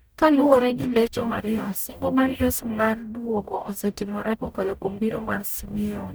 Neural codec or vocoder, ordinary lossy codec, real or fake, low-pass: codec, 44.1 kHz, 0.9 kbps, DAC; none; fake; none